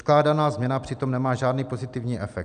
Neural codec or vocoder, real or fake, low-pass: none; real; 9.9 kHz